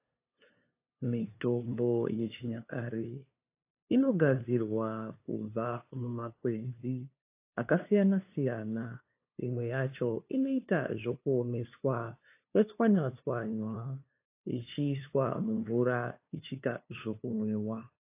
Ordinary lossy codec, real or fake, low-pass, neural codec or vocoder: AAC, 24 kbps; fake; 3.6 kHz; codec, 16 kHz, 2 kbps, FunCodec, trained on LibriTTS, 25 frames a second